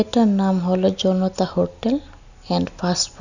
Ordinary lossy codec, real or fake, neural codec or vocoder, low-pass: none; real; none; 7.2 kHz